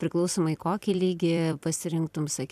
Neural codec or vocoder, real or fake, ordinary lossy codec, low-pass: vocoder, 44.1 kHz, 128 mel bands every 256 samples, BigVGAN v2; fake; MP3, 96 kbps; 14.4 kHz